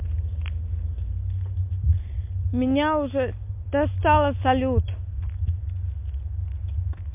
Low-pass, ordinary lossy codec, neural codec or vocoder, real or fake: 3.6 kHz; MP3, 32 kbps; none; real